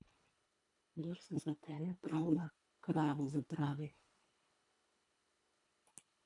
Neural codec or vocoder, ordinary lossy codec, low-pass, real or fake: codec, 24 kHz, 1.5 kbps, HILCodec; AAC, 64 kbps; 10.8 kHz; fake